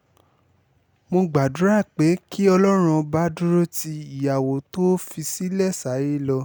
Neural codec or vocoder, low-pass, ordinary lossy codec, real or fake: none; none; none; real